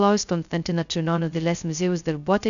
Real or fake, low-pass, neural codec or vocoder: fake; 7.2 kHz; codec, 16 kHz, 0.2 kbps, FocalCodec